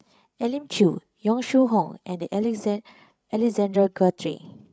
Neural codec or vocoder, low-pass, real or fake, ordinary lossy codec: codec, 16 kHz, 8 kbps, FreqCodec, larger model; none; fake; none